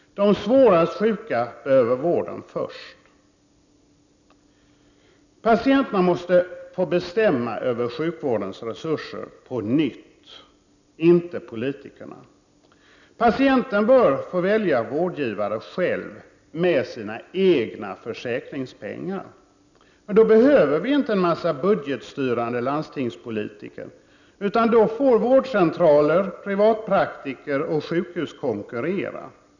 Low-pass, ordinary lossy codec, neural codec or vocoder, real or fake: 7.2 kHz; none; none; real